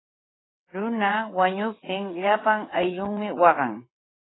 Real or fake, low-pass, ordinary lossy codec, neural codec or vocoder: fake; 7.2 kHz; AAC, 16 kbps; vocoder, 22.05 kHz, 80 mel bands, WaveNeXt